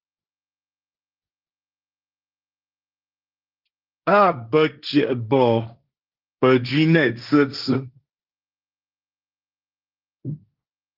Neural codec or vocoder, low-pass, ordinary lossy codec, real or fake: codec, 16 kHz, 1.1 kbps, Voila-Tokenizer; 5.4 kHz; Opus, 24 kbps; fake